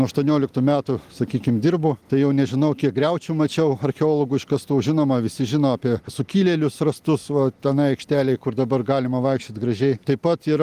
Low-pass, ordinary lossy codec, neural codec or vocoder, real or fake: 14.4 kHz; Opus, 24 kbps; none; real